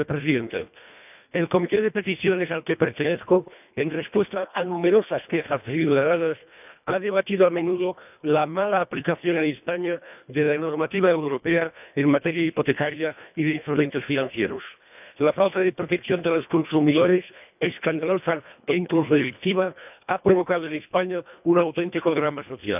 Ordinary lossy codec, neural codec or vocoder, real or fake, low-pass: none; codec, 24 kHz, 1.5 kbps, HILCodec; fake; 3.6 kHz